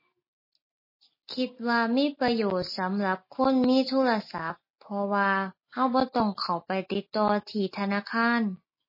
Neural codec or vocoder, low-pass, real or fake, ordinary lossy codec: none; 5.4 kHz; real; MP3, 24 kbps